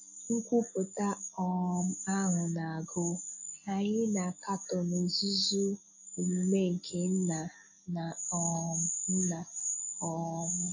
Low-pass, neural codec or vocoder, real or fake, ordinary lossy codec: 7.2 kHz; none; real; AAC, 48 kbps